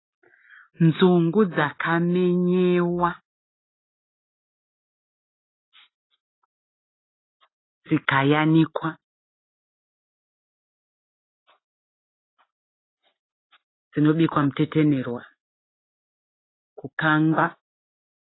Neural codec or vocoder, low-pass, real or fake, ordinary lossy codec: none; 7.2 kHz; real; AAC, 16 kbps